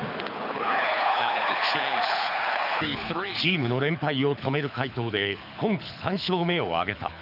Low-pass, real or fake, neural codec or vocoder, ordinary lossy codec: 5.4 kHz; fake; codec, 24 kHz, 6 kbps, HILCodec; none